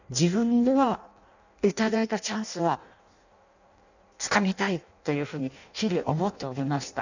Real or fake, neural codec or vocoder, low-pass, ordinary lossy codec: fake; codec, 16 kHz in and 24 kHz out, 0.6 kbps, FireRedTTS-2 codec; 7.2 kHz; none